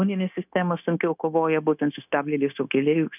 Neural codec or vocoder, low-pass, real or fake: codec, 16 kHz, 0.9 kbps, LongCat-Audio-Codec; 3.6 kHz; fake